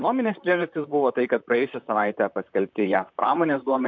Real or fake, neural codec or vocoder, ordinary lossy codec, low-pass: fake; vocoder, 44.1 kHz, 128 mel bands, Pupu-Vocoder; MP3, 64 kbps; 7.2 kHz